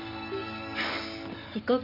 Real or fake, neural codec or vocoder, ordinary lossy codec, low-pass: real; none; none; 5.4 kHz